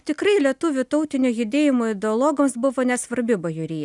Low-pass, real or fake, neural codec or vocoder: 10.8 kHz; real; none